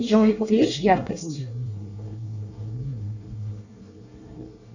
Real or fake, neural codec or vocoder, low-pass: fake; codec, 24 kHz, 1 kbps, SNAC; 7.2 kHz